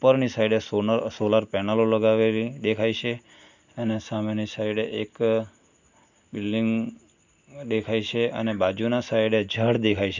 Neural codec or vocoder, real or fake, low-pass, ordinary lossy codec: none; real; 7.2 kHz; none